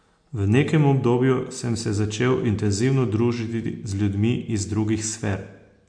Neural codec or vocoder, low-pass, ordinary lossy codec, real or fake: none; 9.9 kHz; MP3, 48 kbps; real